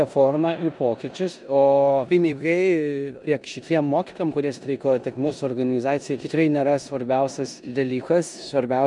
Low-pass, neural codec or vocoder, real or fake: 10.8 kHz; codec, 16 kHz in and 24 kHz out, 0.9 kbps, LongCat-Audio-Codec, four codebook decoder; fake